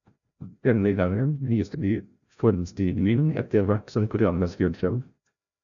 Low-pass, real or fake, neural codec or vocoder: 7.2 kHz; fake; codec, 16 kHz, 0.5 kbps, FreqCodec, larger model